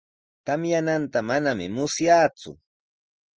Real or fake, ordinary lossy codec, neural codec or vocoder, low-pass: real; Opus, 24 kbps; none; 7.2 kHz